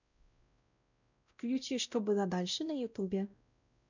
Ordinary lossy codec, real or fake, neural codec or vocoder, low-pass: none; fake; codec, 16 kHz, 0.5 kbps, X-Codec, WavLM features, trained on Multilingual LibriSpeech; 7.2 kHz